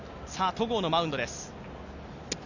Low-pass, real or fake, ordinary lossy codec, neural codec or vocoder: 7.2 kHz; real; none; none